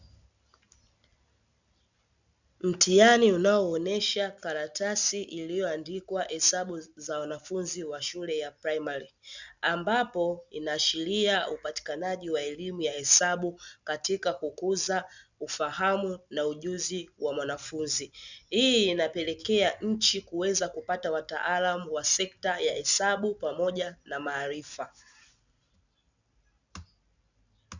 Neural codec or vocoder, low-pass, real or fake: none; 7.2 kHz; real